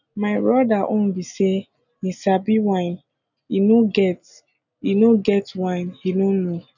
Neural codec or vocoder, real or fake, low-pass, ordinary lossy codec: none; real; 7.2 kHz; none